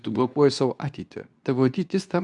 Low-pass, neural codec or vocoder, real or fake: 10.8 kHz; codec, 24 kHz, 0.9 kbps, WavTokenizer, medium speech release version 2; fake